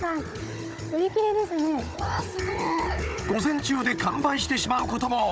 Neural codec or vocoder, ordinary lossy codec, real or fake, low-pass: codec, 16 kHz, 16 kbps, FunCodec, trained on Chinese and English, 50 frames a second; none; fake; none